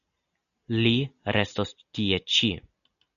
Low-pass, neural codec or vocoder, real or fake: 7.2 kHz; none; real